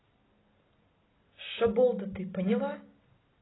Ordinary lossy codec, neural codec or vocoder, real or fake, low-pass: AAC, 16 kbps; none; real; 7.2 kHz